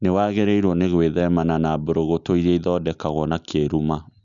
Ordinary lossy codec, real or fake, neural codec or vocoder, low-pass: Opus, 64 kbps; real; none; 7.2 kHz